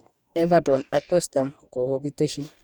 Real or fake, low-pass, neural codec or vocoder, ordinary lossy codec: fake; 19.8 kHz; codec, 44.1 kHz, 2.6 kbps, DAC; none